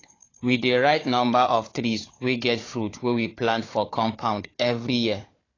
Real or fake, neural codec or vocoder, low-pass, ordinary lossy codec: fake; codec, 16 kHz, 4 kbps, FunCodec, trained on Chinese and English, 50 frames a second; 7.2 kHz; AAC, 32 kbps